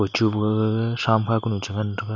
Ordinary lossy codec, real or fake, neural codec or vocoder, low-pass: none; real; none; 7.2 kHz